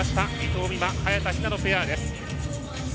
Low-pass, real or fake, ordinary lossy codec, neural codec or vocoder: none; real; none; none